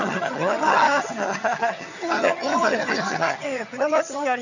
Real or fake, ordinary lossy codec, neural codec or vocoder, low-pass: fake; none; vocoder, 22.05 kHz, 80 mel bands, HiFi-GAN; 7.2 kHz